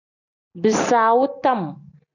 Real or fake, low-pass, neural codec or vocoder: real; 7.2 kHz; none